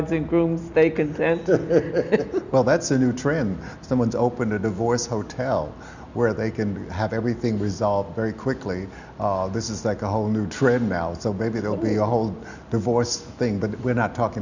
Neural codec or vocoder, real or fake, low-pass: none; real; 7.2 kHz